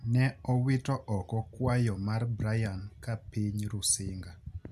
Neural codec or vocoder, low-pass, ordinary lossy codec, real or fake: none; 14.4 kHz; none; real